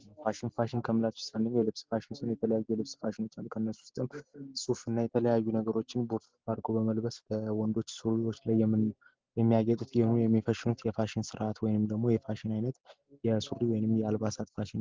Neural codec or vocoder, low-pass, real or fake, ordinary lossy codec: none; 7.2 kHz; real; Opus, 16 kbps